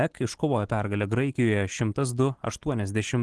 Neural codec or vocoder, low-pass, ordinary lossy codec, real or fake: none; 10.8 kHz; Opus, 16 kbps; real